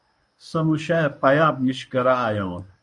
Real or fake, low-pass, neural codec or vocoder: fake; 10.8 kHz; codec, 24 kHz, 0.9 kbps, WavTokenizer, medium speech release version 1